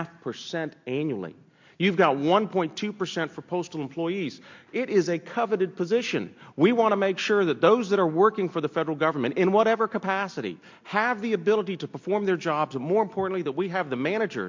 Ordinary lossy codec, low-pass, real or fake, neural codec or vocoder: MP3, 48 kbps; 7.2 kHz; real; none